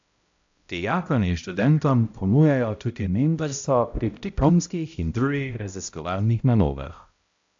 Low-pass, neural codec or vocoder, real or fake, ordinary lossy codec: 7.2 kHz; codec, 16 kHz, 0.5 kbps, X-Codec, HuBERT features, trained on balanced general audio; fake; AAC, 64 kbps